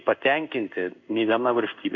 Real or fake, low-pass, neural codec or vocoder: fake; 7.2 kHz; codec, 16 kHz in and 24 kHz out, 1 kbps, XY-Tokenizer